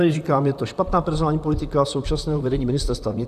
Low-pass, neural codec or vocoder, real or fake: 14.4 kHz; vocoder, 44.1 kHz, 128 mel bands, Pupu-Vocoder; fake